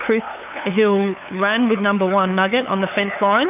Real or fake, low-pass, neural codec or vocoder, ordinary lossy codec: fake; 3.6 kHz; codec, 16 kHz, 8 kbps, FunCodec, trained on LibriTTS, 25 frames a second; AAC, 32 kbps